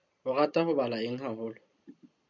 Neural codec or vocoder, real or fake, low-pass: vocoder, 44.1 kHz, 128 mel bands every 256 samples, BigVGAN v2; fake; 7.2 kHz